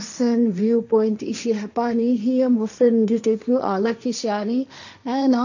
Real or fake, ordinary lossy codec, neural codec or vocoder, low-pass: fake; none; codec, 16 kHz, 1.1 kbps, Voila-Tokenizer; 7.2 kHz